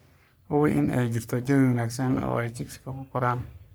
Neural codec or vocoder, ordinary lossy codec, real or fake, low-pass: codec, 44.1 kHz, 3.4 kbps, Pupu-Codec; none; fake; none